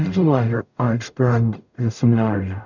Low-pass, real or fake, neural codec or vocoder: 7.2 kHz; fake; codec, 44.1 kHz, 0.9 kbps, DAC